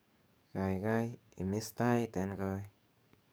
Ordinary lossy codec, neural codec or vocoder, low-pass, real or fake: none; codec, 44.1 kHz, 7.8 kbps, DAC; none; fake